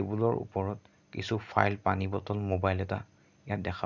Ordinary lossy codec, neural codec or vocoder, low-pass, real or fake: none; none; 7.2 kHz; real